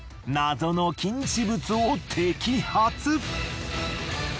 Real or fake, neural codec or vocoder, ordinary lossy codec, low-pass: real; none; none; none